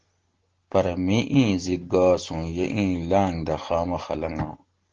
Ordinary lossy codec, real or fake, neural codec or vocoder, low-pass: Opus, 16 kbps; real; none; 7.2 kHz